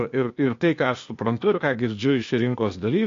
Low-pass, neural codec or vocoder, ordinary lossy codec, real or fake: 7.2 kHz; codec, 16 kHz, 0.8 kbps, ZipCodec; MP3, 48 kbps; fake